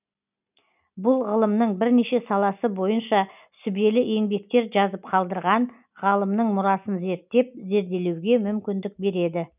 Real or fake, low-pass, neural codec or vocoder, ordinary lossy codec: real; 3.6 kHz; none; none